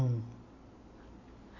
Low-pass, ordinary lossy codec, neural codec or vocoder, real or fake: 7.2 kHz; none; none; real